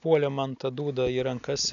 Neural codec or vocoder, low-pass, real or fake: none; 7.2 kHz; real